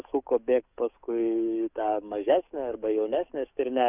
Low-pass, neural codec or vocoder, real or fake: 3.6 kHz; codec, 44.1 kHz, 7.8 kbps, DAC; fake